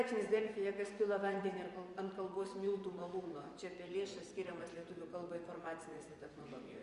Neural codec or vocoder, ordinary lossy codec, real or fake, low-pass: vocoder, 24 kHz, 100 mel bands, Vocos; Opus, 64 kbps; fake; 10.8 kHz